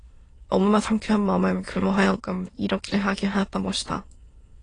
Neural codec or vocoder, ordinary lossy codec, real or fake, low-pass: autoencoder, 22.05 kHz, a latent of 192 numbers a frame, VITS, trained on many speakers; AAC, 32 kbps; fake; 9.9 kHz